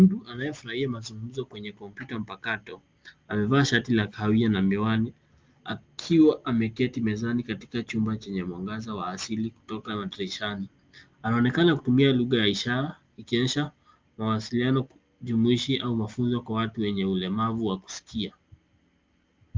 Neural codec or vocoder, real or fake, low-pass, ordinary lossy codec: none; real; 7.2 kHz; Opus, 32 kbps